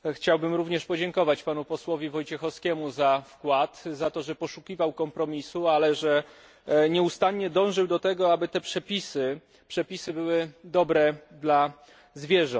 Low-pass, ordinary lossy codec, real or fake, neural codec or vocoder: none; none; real; none